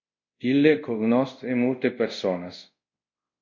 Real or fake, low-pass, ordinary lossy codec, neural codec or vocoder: fake; 7.2 kHz; MP3, 48 kbps; codec, 24 kHz, 0.5 kbps, DualCodec